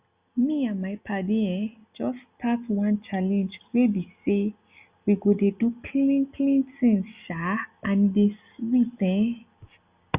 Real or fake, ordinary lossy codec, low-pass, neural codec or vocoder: real; Opus, 64 kbps; 3.6 kHz; none